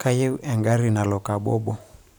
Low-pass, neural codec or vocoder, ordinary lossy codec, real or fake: none; vocoder, 44.1 kHz, 128 mel bands every 512 samples, BigVGAN v2; none; fake